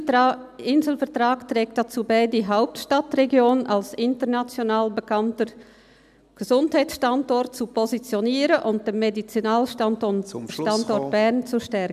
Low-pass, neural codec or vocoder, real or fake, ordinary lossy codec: 14.4 kHz; none; real; none